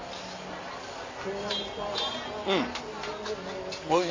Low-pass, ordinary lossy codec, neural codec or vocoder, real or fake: 7.2 kHz; MP3, 48 kbps; none; real